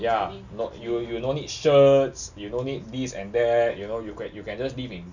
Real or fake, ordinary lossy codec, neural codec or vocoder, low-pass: real; none; none; 7.2 kHz